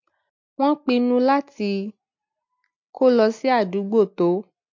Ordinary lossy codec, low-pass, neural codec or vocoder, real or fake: MP3, 48 kbps; 7.2 kHz; none; real